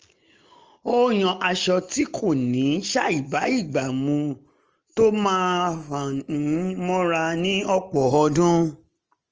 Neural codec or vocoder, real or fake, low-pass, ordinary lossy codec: none; real; 7.2 kHz; Opus, 24 kbps